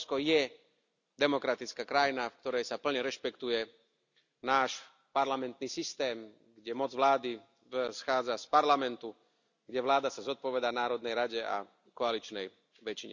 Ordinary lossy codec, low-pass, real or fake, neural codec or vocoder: none; 7.2 kHz; real; none